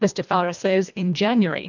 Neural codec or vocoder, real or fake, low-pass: codec, 24 kHz, 1.5 kbps, HILCodec; fake; 7.2 kHz